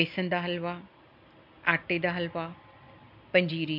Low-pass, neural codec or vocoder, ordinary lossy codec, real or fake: 5.4 kHz; none; MP3, 48 kbps; real